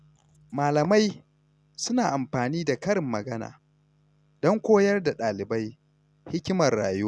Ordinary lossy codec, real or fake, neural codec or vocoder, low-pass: none; real; none; none